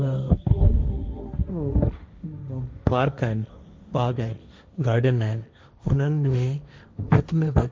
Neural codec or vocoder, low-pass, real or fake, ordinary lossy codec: codec, 16 kHz, 1.1 kbps, Voila-Tokenizer; none; fake; none